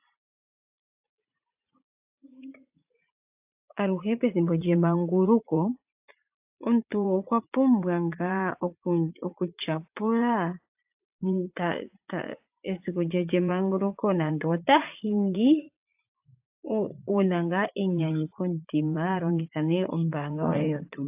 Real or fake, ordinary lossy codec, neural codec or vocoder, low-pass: fake; AAC, 32 kbps; vocoder, 44.1 kHz, 80 mel bands, Vocos; 3.6 kHz